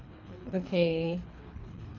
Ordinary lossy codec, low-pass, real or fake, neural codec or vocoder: none; 7.2 kHz; fake; codec, 24 kHz, 3 kbps, HILCodec